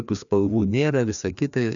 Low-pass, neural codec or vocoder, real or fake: 7.2 kHz; codec, 16 kHz, 2 kbps, FreqCodec, larger model; fake